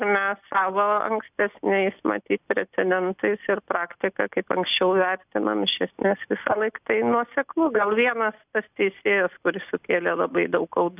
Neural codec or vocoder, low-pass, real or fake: none; 3.6 kHz; real